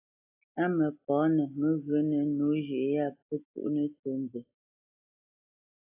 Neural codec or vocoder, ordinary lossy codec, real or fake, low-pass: none; AAC, 32 kbps; real; 3.6 kHz